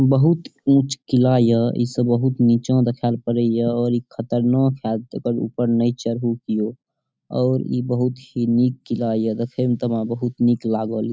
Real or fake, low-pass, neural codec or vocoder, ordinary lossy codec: real; none; none; none